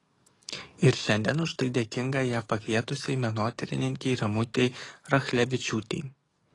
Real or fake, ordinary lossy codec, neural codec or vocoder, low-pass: fake; AAC, 32 kbps; codec, 44.1 kHz, 7.8 kbps, DAC; 10.8 kHz